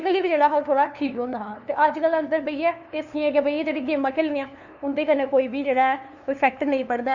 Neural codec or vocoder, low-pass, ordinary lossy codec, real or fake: codec, 16 kHz, 2 kbps, FunCodec, trained on LibriTTS, 25 frames a second; 7.2 kHz; none; fake